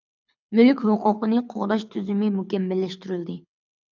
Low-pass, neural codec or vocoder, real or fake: 7.2 kHz; codec, 24 kHz, 6 kbps, HILCodec; fake